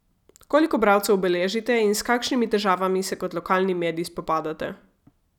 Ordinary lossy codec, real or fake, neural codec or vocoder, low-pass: none; real; none; 19.8 kHz